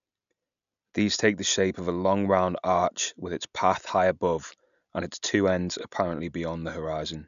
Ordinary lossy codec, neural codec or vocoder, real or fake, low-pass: none; none; real; 7.2 kHz